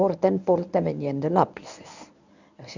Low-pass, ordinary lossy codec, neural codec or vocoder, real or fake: 7.2 kHz; none; codec, 24 kHz, 0.9 kbps, WavTokenizer, medium speech release version 1; fake